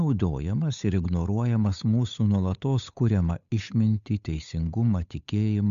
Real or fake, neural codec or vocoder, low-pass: fake; codec, 16 kHz, 8 kbps, FunCodec, trained on Chinese and English, 25 frames a second; 7.2 kHz